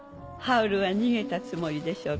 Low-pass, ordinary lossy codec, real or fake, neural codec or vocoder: none; none; real; none